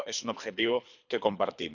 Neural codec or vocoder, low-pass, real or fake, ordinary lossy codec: codec, 24 kHz, 3 kbps, HILCodec; 7.2 kHz; fake; none